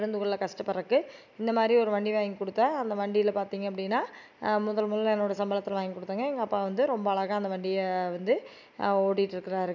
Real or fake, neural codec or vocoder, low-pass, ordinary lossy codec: real; none; 7.2 kHz; none